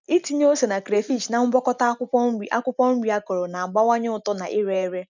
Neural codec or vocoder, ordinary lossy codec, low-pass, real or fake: none; none; 7.2 kHz; real